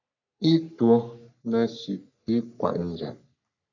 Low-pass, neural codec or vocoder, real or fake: 7.2 kHz; codec, 44.1 kHz, 3.4 kbps, Pupu-Codec; fake